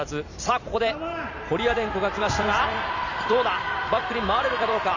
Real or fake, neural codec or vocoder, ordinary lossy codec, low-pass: fake; vocoder, 44.1 kHz, 128 mel bands every 256 samples, BigVGAN v2; none; 7.2 kHz